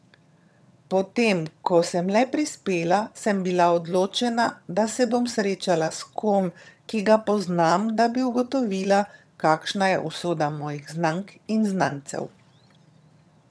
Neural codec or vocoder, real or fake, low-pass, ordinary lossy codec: vocoder, 22.05 kHz, 80 mel bands, HiFi-GAN; fake; none; none